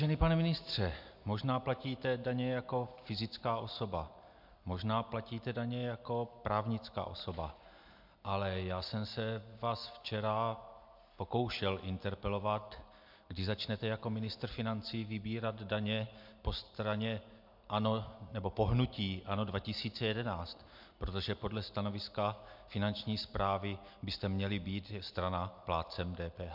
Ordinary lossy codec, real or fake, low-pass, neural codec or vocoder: MP3, 48 kbps; real; 5.4 kHz; none